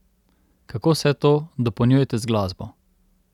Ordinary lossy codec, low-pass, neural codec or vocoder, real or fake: none; 19.8 kHz; none; real